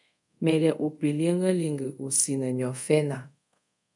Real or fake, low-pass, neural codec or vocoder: fake; 10.8 kHz; codec, 24 kHz, 0.5 kbps, DualCodec